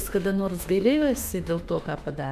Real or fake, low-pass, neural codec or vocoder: fake; 14.4 kHz; autoencoder, 48 kHz, 32 numbers a frame, DAC-VAE, trained on Japanese speech